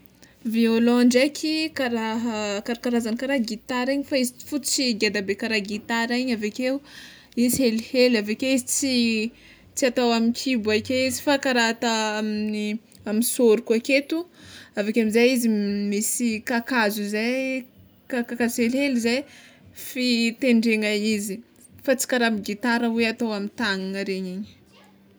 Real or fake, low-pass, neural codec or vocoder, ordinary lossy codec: real; none; none; none